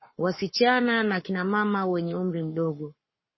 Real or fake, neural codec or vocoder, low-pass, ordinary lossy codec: fake; codec, 44.1 kHz, 7.8 kbps, Pupu-Codec; 7.2 kHz; MP3, 24 kbps